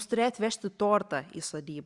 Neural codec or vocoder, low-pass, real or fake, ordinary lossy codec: none; 10.8 kHz; real; Opus, 64 kbps